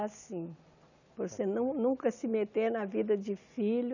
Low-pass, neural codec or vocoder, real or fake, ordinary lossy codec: 7.2 kHz; none; real; none